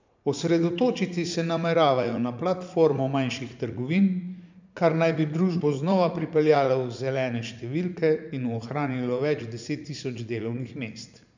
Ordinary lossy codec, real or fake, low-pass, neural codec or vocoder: MP3, 64 kbps; fake; 7.2 kHz; vocoder, 44.1 kHz, 80 mel bands, Vocos